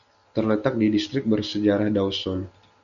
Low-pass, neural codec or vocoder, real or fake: 7.2 kHz; none; real